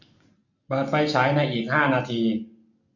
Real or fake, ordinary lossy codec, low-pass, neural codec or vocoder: real; none; 7.2 kHz; none